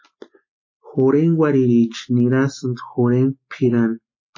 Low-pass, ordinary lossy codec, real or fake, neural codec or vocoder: 7.2 kHz; MP3, 32 kbps; real; none